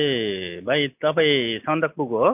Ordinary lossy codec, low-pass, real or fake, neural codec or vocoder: AAC, 32 kbps; 3.6 kHz; real; none